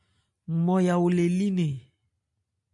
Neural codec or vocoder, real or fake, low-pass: none; real; 10.8 kHz